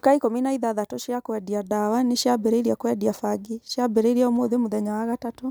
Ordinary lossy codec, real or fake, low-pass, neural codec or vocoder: none; real; none; none